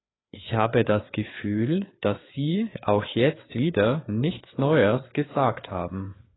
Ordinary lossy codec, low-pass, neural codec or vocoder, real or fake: AAC, 16 kbps; 7.2 kHz; codec, 16 kHz, 4 kbps, FreqCodec, larger model; fake